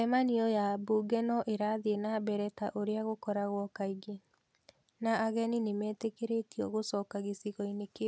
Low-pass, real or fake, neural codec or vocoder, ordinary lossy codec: none; real; none; none